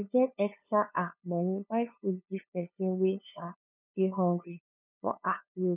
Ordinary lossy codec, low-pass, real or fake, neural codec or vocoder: none; 3.6 kHz; fake; codec, 16 kHz, 2 kbps, FunCodec, trained on LibriTTS, 25 frames a second